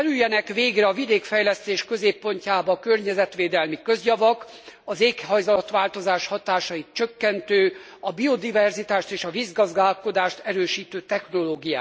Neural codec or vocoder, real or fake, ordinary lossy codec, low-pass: none; real; none; none